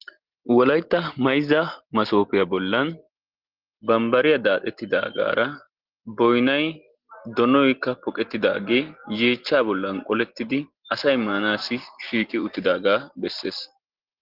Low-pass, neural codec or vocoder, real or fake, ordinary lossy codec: 5.4 kHz; none; real; Opus, 16 kbps